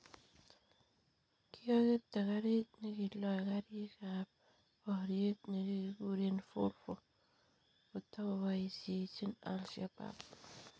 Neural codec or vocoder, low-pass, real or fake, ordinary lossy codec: none; none; real; none